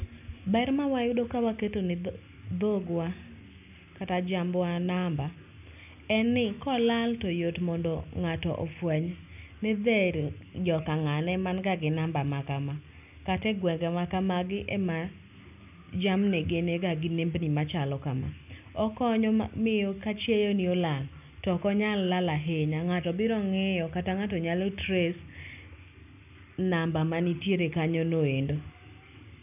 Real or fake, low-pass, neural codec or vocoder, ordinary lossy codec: real; 3.6 kHz; none; none